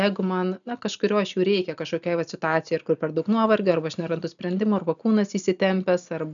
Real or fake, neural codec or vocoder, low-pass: real; none; 7.2 kHz